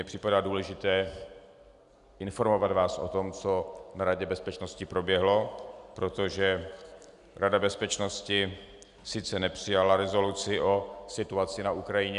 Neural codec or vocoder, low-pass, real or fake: none; 10.8 kHz; real